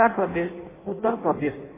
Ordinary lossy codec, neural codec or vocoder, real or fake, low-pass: MP3, 16 kbps; codec, 16 kHz in and 24 kHz out, 0.6 kbps, FireRedTTS-2 codec; fake; 3.6 kHz